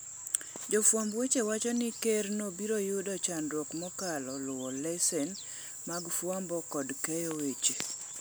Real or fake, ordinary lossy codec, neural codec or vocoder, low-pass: real; none; none; none